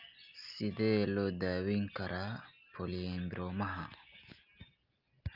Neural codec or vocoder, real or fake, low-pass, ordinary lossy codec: none; real; 5.4 kHz; Opus, 24 kbps